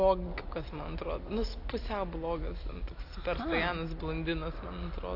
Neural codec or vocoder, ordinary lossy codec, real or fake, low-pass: none; AAC, 32 kbps; real; 5.4 kHz